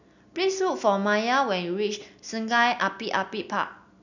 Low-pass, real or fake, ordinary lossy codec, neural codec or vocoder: 7.2 kHz; real; none; none